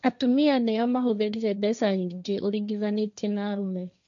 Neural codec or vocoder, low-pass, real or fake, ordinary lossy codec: codec, 16 kHz, 1.1 kbps, Voila-Tokenizer; 7.2 kHz; fake; none